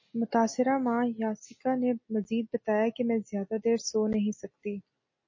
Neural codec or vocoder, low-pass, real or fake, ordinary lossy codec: none; 7.2 kHz; real; MP3, 32 kbps